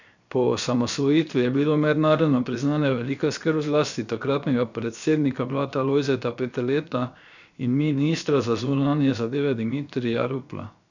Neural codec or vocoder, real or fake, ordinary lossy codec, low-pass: codec, 16 kHz, 0.7 kbps, FocalCodec; fake; none; 7.2 kHz